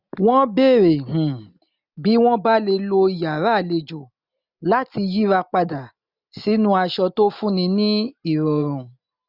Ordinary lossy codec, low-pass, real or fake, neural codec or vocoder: none; 5.4 kHz; real; none